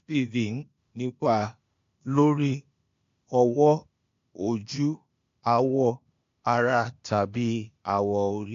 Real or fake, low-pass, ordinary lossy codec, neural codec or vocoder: fake; 7.2 kHz; MP3, 48 kbps; codec, 16 kHz, 0.8 kbps, ZipCodec